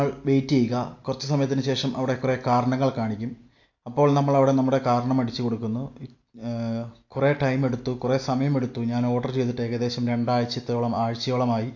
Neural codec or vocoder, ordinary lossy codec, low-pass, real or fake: none; AAC, 48 kbps; 7.2 kHz; real